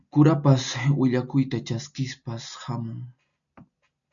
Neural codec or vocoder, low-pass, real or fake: none; 7.2 kHz; real